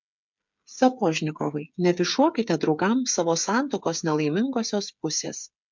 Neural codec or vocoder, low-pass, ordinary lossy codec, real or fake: codec, 16 kHz, 8 kbps, FreqCodec, smaller model; 7.2 kHz; MP3, 64 kbps; fake